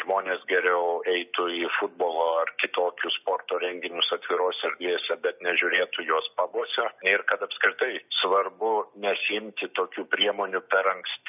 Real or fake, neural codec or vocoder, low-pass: real; none; 3.6 kHz